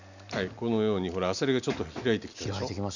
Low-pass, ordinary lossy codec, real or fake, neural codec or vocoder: 7.2 kHz; none; real; none